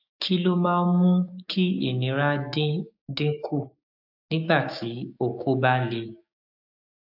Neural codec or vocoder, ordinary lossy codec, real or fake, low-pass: codec, 16 kHz, 6 kbps, DAC; AAC, 48 kbps; fake; 5.4 kHz